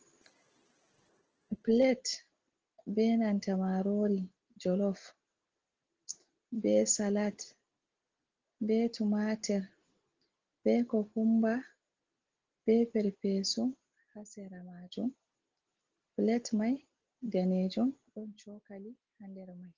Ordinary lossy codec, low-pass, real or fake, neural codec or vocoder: Opus, 16 kbps; 7.2 kHz; real; none